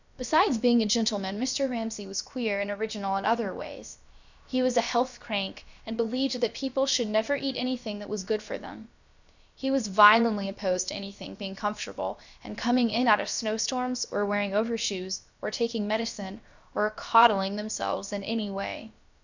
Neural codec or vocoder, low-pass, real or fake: codec, 16 kHz, about 1 kbps, DyCAST, with the encoder's durations; 7.2 kHz; fake